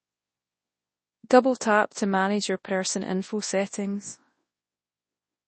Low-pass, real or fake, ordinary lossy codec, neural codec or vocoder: 10.8 kHz; fake; MP3, 32 kbps; codec, 24 kHz, 0.9 kbps, WavTokenizer, large speech release